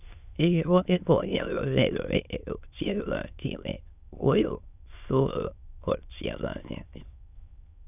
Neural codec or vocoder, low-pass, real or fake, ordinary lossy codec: autoencoder, 22.05 kHz, a latent of 192 numbers a frame, VITS, trained on many speakers; 3.6 kHz; fake; none